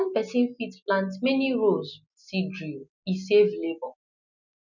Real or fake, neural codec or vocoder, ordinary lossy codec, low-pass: real; none; none; 7.2 kHz